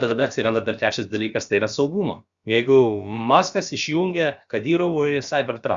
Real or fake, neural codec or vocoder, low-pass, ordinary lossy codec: fake; codec, 16 kHz, about 1 kbps, DyCAST, with the encoder's durations; 7.2 kHz; Opus, 64 kbps